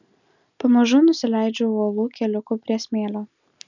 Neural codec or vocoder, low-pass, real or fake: none; 7.2 kHz; real